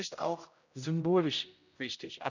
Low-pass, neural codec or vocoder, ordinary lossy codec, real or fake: 7.2 kHz; codec, 16 kHz, 0.5 kbps, X-Codec, HuBERT features, trained on general audio; none; fake